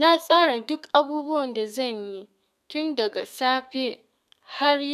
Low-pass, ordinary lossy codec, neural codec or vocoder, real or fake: 14.4 kHz; none; codec, 32 kHz, 1.9 kbps, SNAC; fake